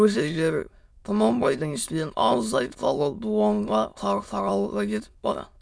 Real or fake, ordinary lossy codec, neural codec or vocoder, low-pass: fake; none; autoencoder, 22.05 kHz, a latent of 192 numbers a frame, VITS, trained on many speakers; none